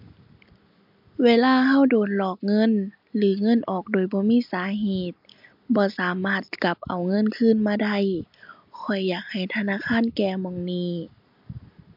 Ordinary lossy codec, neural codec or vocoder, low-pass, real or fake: MP3, 48 kbps; none; 5.4 kHz; real